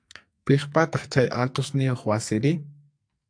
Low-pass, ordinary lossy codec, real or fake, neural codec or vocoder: 9.9 kHz; AAC, 64 kbps; fake; codec, 44.1 kHz, 2.6 kbps, SNAC